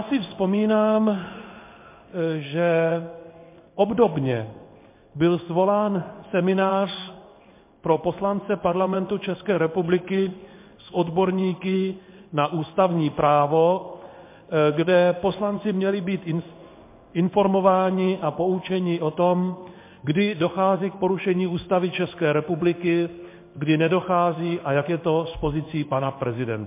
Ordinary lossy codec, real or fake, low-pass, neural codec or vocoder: MP3, 24 kbps; fake; 3.6 kHz; codec, 16 kHz in and 24 kHz out, 1 kbps, XY-Tokenizer